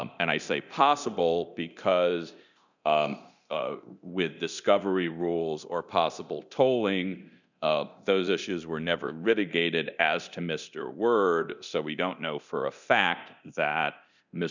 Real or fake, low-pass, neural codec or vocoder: fake; 7.2 kHz; codec, 24 kHz, 0.9 kbps, DualCodec